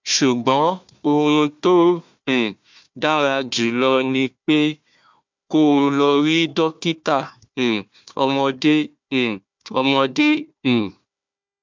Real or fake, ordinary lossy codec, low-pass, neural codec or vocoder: fake; MP3, 64 kbps; 7.2 kHz; codec, 16 kHz, 1 kbps, FunCodec, trained on Chinese and English, 50 frames a second